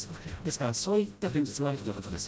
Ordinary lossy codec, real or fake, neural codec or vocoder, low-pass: none; fake; codec, 16 kHz, 0.5 kbps, FreqCodec, smaller model; none